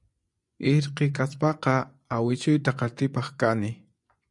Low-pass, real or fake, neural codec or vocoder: 10.8 kHz; fake; vocoder, 24 kHz, 100 mel bands, Vocos